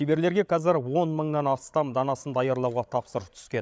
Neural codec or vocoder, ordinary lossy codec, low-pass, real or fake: codec, 16 kHz, 16 kbps, FreqCodec, larger model; none; none; fake